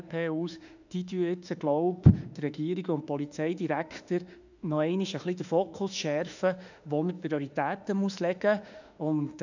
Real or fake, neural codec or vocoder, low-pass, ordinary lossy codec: fake; autoencoder, 48 kHz, 32 numbers a frame, DAC-VAE, trained on Japanese speech; 7.2 kHz; none